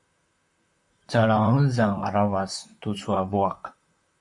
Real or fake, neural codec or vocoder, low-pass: fake; vocoder, 44.1 kHz, 128 mel bands, Pupu-Vocoder; 10.8 kHz